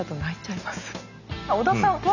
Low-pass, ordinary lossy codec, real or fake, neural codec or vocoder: 7.2 kHz; none; real; none